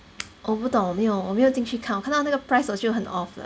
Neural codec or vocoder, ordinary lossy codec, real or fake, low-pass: none; none; real; none